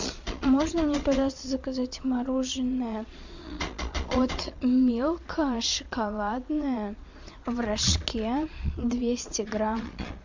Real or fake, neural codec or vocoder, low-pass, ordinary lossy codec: fake; vocoder, 22.05 kHz, 80 mel bands, Vocos; 7.2 kHz; MP3, 64 kbps